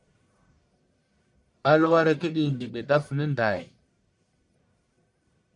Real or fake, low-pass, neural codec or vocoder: fake; 10.8 kHz; codec, 44.1 kHz, 1.7 kbps, Pupu-Codec